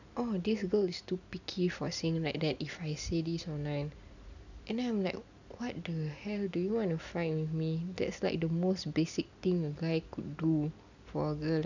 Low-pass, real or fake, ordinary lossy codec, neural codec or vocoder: 7.2 kHz; real; none; none